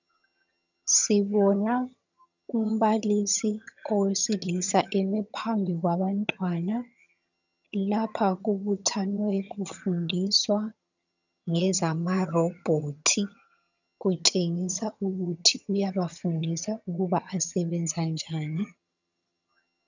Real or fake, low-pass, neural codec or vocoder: fake; 7.2 kHz; vocoder, 22.05 kHz, 80 mel bands, HiFi-GAN